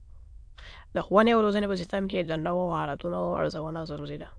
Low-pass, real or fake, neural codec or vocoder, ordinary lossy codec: 9.9 kHz; fake; autoencoder, 22.05 kHz, a latent of 192 numbers a frame, VITS, trained on many speakers; AAC, 64 kbps